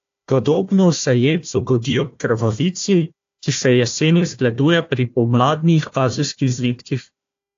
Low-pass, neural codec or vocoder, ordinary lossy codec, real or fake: 7.2 kHz; codec, 16 kHz, 1 kbps, FunCodec, trained on Chinese and English, 50 frames a second; AAC, 48 kbps; fake